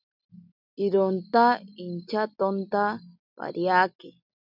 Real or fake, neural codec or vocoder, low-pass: real; none; 5.4 kHz